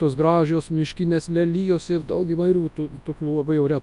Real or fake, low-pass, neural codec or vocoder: fake; 10.8 kHz; codec, 24 kHz, 0.9 kbps, WavTokenizer, large speech release